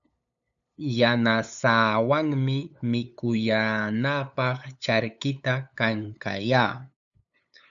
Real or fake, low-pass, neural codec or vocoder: fake; 7.2 kHz; codec, 16 kHz, 8 kbps, FunCodec, trained on LibriTTS, 25 frames a second